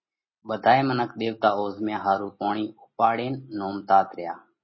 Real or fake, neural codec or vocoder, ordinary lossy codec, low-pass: real; none; MP3, 24 kbps; 7.2 kHz